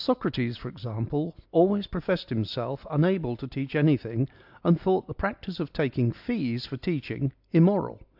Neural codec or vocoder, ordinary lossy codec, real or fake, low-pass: none; AAC, 48 kbps; real; 5.4 kHz